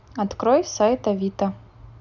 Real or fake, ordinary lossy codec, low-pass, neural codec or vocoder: real; none; 7.2 kHz; none